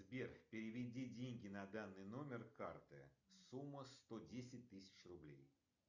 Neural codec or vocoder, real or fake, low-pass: none; real; 7.2 kHz